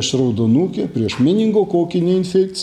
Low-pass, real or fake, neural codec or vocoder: 19.8 kHz; real; none